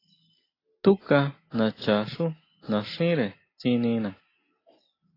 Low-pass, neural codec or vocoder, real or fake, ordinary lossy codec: 5.4 kHz; none; real; AAC, 24 kbps